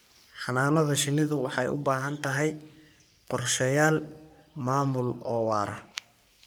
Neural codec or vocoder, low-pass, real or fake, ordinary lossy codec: codec, 44.1 kHz, 3.4 kbps, Pupu-Codec; none; fake; none